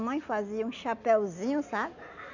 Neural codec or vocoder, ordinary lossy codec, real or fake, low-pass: none; none; real; 7.2 kHz